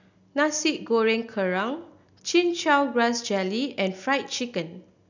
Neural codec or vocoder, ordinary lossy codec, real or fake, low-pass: none; none; real; 7.2 kHz